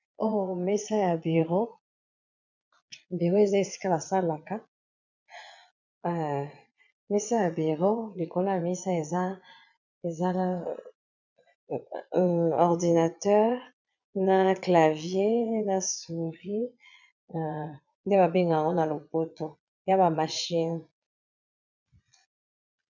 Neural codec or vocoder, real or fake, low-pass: vocoder, 22.05 kHz, 80 mel bands, Vocos; fake; 7.2 kHz